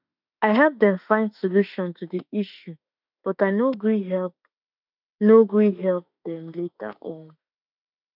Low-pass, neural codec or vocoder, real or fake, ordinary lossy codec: 5.4 kHz; autoencoder, 48 kHz, 32 numbers a frame, DAC-VAE, trained on Japanese speech; fake; none